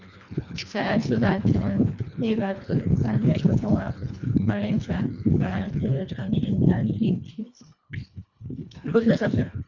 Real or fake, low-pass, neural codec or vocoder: fake; 7.2 kHz; codec, 24 kHz, 1.5 kbps, HILCodec